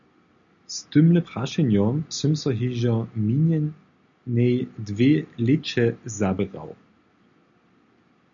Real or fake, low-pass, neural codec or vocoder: real; 7.2 kHz; none